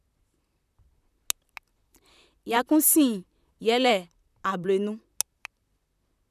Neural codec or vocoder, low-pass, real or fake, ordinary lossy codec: vocoder, 44.1 kHz, 128 mel bands, Pupu-Vocoder; 14.4 kHz; fake; none